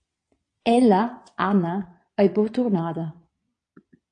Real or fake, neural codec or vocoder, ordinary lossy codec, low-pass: fake; vocoder, 22.05 kHz, 80 mel bands, Vocos; AAC, 48 kbps; 9.9 kHz